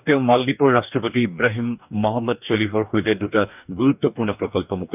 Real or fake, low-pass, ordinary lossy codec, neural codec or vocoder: fake; 3.6 kHz; none; codec, 44.1 kHz, 2.6 kbps, DAC